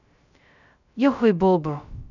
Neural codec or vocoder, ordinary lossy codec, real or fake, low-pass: codec, 16 kHz, 0.2 kbps, FocalCodec; none; fake; 7.2 kHz